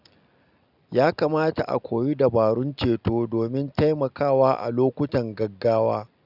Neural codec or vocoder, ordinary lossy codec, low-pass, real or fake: none; none; 5.4 kHz; real